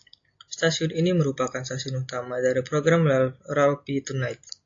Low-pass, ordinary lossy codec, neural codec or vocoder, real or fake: 7.2 kHz; AAC, 48 kbps; none; real